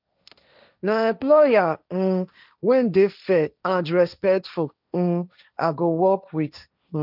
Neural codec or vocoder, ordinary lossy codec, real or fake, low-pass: codec, 16 kHz, 1.1 kbps, Voila-Tokenizer; none; fake; 5.4 kHz